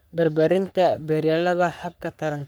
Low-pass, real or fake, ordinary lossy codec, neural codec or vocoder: none; fake; none; codec, 44.1 kHz, 3.4 kbps, Pupu-Codec